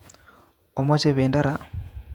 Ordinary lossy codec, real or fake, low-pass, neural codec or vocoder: none; fake; 19.8 kHz; vocoder, 48 kHz, 128 mel bands, Vocos